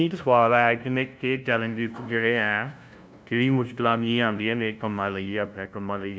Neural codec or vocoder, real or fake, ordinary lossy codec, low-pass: codec, 16 kHz, 0.5 kbps, FunCodec, trained on LibriTTS, 25 frames a second; fake; none; none